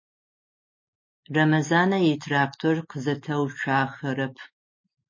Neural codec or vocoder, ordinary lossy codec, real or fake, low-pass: none; MP3, 32 kbps; real; 7.2 kHz